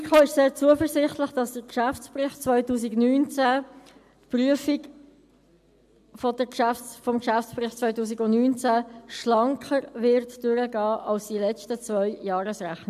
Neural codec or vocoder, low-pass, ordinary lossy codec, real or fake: none; 14.4 kHz; AAC, 96 kbps; real